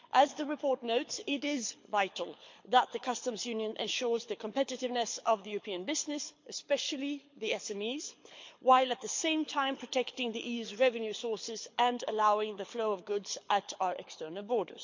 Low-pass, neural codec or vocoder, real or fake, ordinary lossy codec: 7.2 kHz; codec, 24 kHz, 6 kbps, HILCodec; fake; MP3, 48 kbps